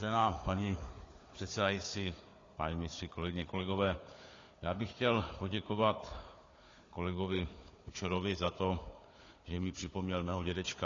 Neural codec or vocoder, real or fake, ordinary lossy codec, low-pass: codec, 16 kHz, 4 kbps, FunCodec, trained on Chinese and English, 50 frames a second; fake; AAC, 32 kbps; 7.2 kHz